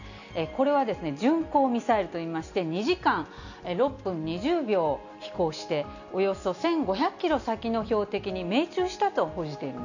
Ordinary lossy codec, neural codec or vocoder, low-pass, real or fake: none; none; 7.2 kHz; real